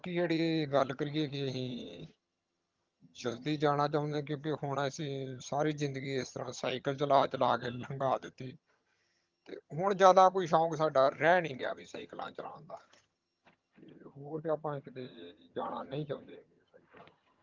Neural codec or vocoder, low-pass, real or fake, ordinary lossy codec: vocoder, 22.05 kHz, 80 mel bands, HiFi-GAN; 7.2 kHz; fake; Opus, 32 kbps